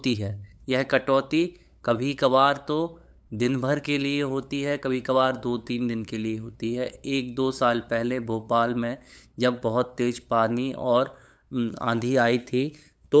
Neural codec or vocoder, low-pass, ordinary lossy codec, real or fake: codec, 16 kHz, 8 kbps, FunCodec, trained on LibriTTS, 25 frames a second; none; none; fake